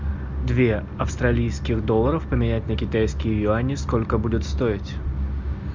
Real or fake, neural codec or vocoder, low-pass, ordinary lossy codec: real; none; 7.2 kHz; MP3, 64 kbps